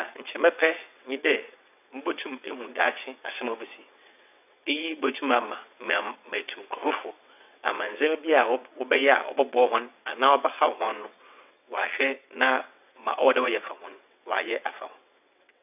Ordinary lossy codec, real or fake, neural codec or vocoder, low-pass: none; fake; vocoder, 22.05 kHz, 80 mel bands, WaveNeXt; 3.6 kHz